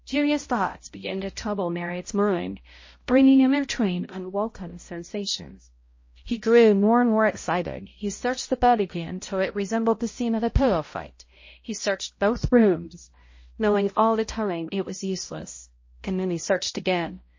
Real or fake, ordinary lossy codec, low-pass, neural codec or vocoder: fake; MP3, 32 kbps; 7.2 kHz; codec, 16 kHz, 0.5 kbps, X-Codec, HuBERT features, trained on balanced general audio